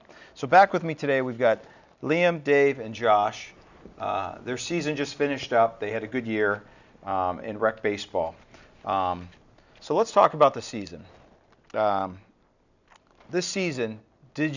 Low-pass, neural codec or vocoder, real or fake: 7.2 kHz; none; real